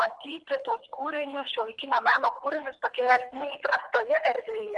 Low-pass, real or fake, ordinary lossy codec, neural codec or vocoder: 10.8 kHz; fake; MP3, 96 kbps; codec, 24 kHz, 3 kbps, HILCodec